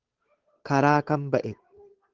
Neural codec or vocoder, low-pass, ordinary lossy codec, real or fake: codec, 16 kHz, 8 kbps, FunCodec, trained on Chinese and English, 25 frames a second; 7.2 kHz; Opus, 16 kbps; fake